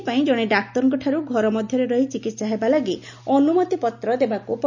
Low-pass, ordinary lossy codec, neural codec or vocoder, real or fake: 7.2 kHz; none; none; real